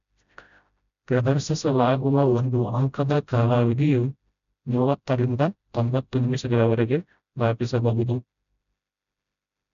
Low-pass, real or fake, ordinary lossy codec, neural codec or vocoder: 7.2 kHz; fake; none; codec, 16 kHz, 0.5 kbps, FreqCodec, smaller model